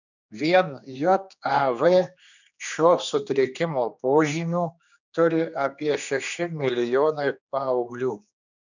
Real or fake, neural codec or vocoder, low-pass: fake; codec, 16 kHz, 2 kbps, X-Codec, HuBERT features, trained on general audio; 7.2 kHz